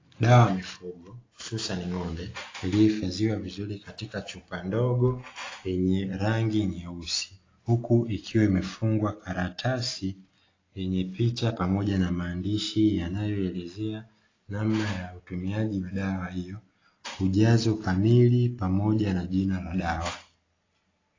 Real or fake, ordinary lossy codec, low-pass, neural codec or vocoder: real; AAC, 32 kbps; 7.2 kHz; none